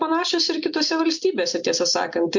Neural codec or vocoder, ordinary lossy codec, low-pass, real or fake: none; MP3, 64 kbps; 7.2 kHz; real